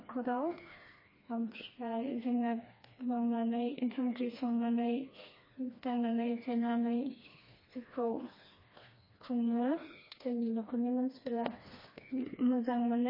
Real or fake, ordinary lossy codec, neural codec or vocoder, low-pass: fake; MP3, 24 kbps; codec, 16 kHz, 2 kbps, FreqCodec, smaller model; 5.4 kHz